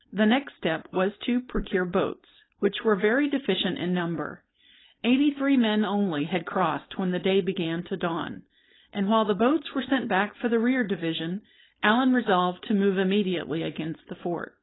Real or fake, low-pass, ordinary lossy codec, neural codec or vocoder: fake; 7.2 kHz; AAC, 16 kbps; codec, 16 kHz, 4.8 kbps, FACodec